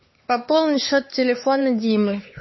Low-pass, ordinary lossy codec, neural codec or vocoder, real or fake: 7.2 kHz; MP3, 24 kbps; codec, 16 kHz, 4 kbps, X-Codec, HuBERT features, trained on LibriSpeech; fake